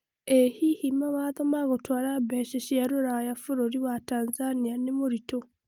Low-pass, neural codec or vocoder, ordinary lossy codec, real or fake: 19.8 kHz; none; Opus, 32 kbps; real